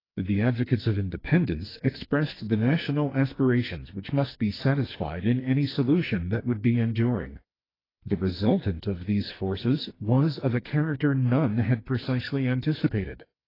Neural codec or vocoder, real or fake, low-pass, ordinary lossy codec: codec, 44.1 kHz, 2.6 kbps, SNAC; fake; 5.4 kHz; AAC, 24 kbps